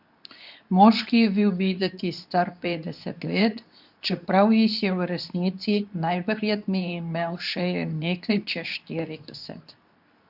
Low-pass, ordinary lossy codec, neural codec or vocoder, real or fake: 5.4 kHz; none; codec, 24 kHz, 0.9 kbps, WavTokenizer, medium speech release version 2; fake